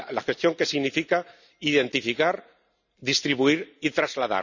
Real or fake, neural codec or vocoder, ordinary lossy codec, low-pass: real; none; none; 7.2 kHz